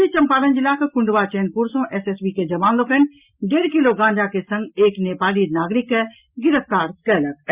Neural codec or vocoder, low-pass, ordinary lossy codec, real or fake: none; 3.6 kHz; Opus, 64 kbps; real